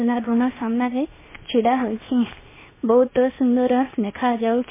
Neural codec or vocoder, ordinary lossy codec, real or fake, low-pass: codec, 16 kHz, 0.7 kbps, FocalCodec; MP3, 16 kbps; fake; 3.6 kHz